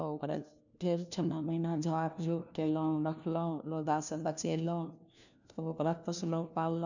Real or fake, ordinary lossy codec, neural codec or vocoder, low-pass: fake; none; codec, 16 kHz, 1 kbps, FunCodec, trained on LibriTTS, 50 frames a second; 7.2 kHz